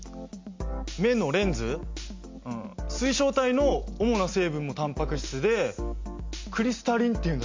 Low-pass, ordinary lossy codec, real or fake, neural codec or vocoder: 7.2 kHz; MP3, 48 kbps; real; none